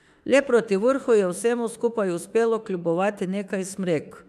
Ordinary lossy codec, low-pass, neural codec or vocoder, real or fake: none; 14.4 kHz; autoencoder, 48 kHz, 32 numbers a frame, DAC-VAE, trained on Japanese speech; fake